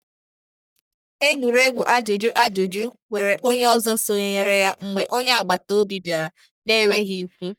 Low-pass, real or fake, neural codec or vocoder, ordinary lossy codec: none; fake; codec, 44.1 kHz, 1.7 kbps, Pupu-Codec; none